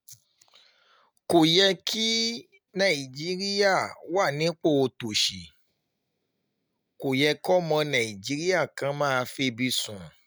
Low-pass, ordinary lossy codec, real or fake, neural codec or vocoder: none; none; real; none